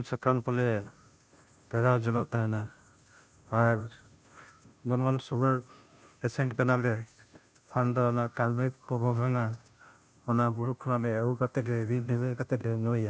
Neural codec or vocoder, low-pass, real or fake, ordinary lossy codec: codec, 16 kHz, 0.5 kbps, FunCodec, trained on Chinese and English, 25 frames a second; none; fake; none